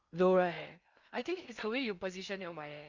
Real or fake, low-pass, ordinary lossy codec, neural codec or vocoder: fake; 7.2 kHz; Opus, 64 kbps; codec, 16 kHz in and 24 kHz out, 0.8 kbps, FocalCodec, streaming, 65536 codes